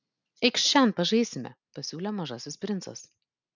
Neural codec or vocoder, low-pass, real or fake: none; 7.2 kHz; real